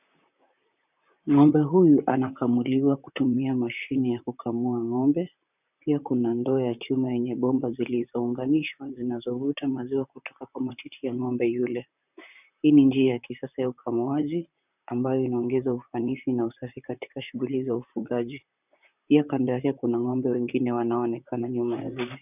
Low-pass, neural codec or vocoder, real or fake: 3.6 kHz; vocoder, 44.1 kHz, 128 mel bands, Pupu-Vocoder; fake